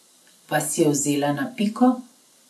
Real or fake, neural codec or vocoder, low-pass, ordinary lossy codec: real; none; none; none